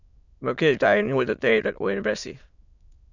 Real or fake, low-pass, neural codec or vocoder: fake; 7.2 kHz; autoencoder, 22.05 kHz, a latent of 192 numbers a frame, VITS, trained on many speakers